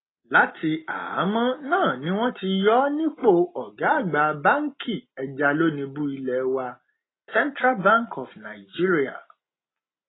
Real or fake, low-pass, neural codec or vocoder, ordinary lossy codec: real; 7.2 kHz; none; AAC, 16 kbps